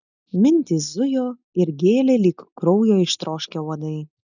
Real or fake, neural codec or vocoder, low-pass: real; none; 7.2 kHz